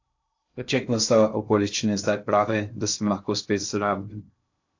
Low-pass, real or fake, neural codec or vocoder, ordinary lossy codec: 7.2 kHz; fake; codec, 16 kHz in and 24 kHz out, 0.6 kbps, FocalCodec, streaming, 2048 codes; AAC, 48 kbps